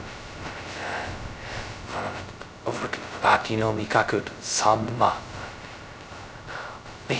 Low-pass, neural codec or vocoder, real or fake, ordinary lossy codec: none; codec, 16 kHz, 0.2 kbps, FocalCodec; fake; none